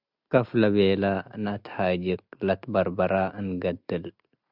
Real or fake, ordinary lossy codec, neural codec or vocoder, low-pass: real; Opus, 64 kbps; none; 5.4 kHz